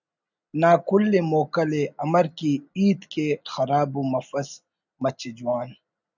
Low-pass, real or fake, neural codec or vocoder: 7.2 kHz; real; none